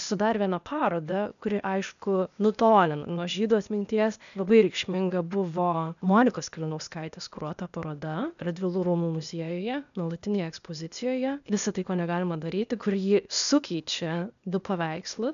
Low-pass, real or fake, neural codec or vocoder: 7.2 kHz; fake; codec, 16 kHz, 0.8 kbps, ZipCodec